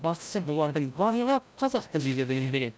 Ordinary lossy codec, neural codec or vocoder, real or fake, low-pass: none; codec, 16 kHz, 0.5 kbps, FreqCodec, larger model; fake; none